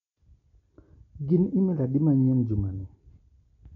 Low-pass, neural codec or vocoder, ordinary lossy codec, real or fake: 7.2 kHz; none; MP3, 48 kbps; real